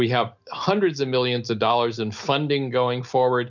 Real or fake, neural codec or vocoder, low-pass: real; none; 7.2 kHz